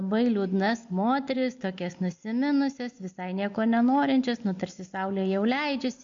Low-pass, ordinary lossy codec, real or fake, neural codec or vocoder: 7.2 kHz; MP3, 48 kbps; real; none